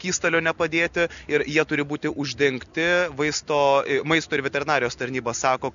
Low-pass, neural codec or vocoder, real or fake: 7.2 kHz; none; real